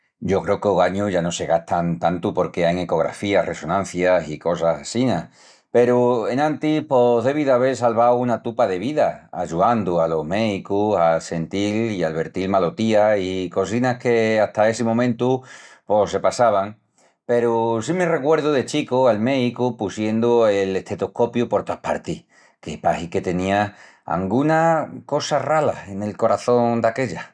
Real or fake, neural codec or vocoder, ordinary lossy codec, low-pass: real; none; none; 9.9 kHz